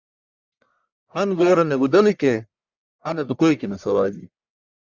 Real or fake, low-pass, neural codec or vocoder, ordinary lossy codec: fake; 7.2 kHz; codec, 44.1 kHz, 1.7 kbps, Pupu-Codec; Opus, 64 kbps